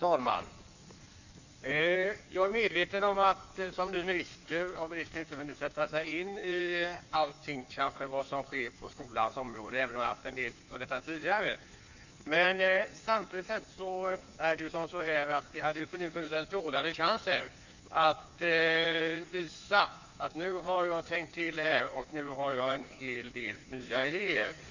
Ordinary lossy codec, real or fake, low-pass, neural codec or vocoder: none; fake; 7.2 kHz; codec, 16 kHz in and 24 kHz out, 1.1 kbps, FireRedTTS-2 codec